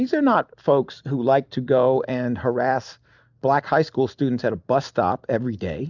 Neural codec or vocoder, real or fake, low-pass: none; real; 7.2 kHz